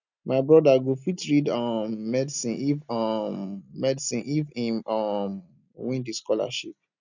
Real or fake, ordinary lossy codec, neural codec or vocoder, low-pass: real; none; none; 7.2 kHz